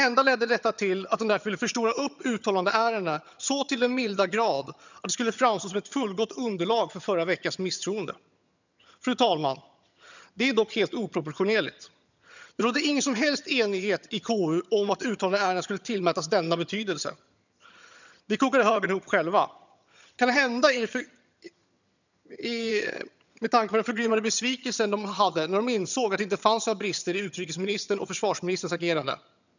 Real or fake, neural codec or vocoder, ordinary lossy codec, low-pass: fake; vocoder, 22.05 kHz, 80 mel bands, HiFi-GAN; none; 7.2 kHz